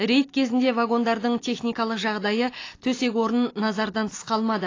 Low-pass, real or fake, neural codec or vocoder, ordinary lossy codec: 7.2 kHz; real; none; AAC, 32 kbps